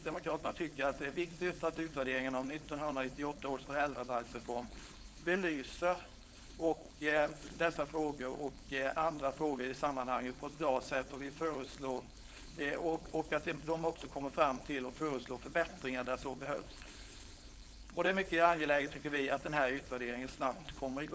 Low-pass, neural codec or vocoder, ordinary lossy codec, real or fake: none; codec, 16 kHz, 4.8 kbps, FACodec; none; fake